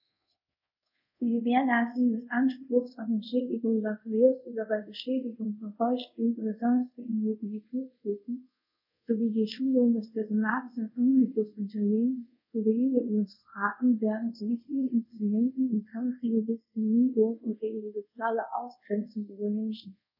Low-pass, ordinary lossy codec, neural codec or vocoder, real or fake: 5.4 kHz; none; codec, 24 kHz, 0.5 kbps, DualCodec; fake